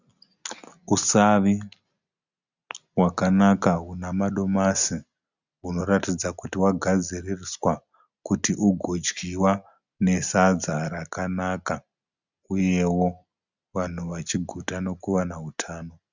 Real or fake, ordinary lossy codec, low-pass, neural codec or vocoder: real; Opus, 64 kbps; 7.2 kHz; none